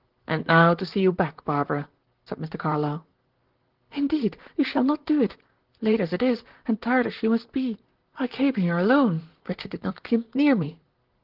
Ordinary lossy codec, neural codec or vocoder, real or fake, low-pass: Opus, 16 kbps; vocoder, 44.1 kHz, 128 mel bands, Pupu-Vocoder; fake; 5.4 kHz